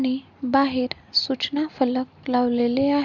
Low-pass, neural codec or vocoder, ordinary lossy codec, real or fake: 7.2 kHz; none; none; real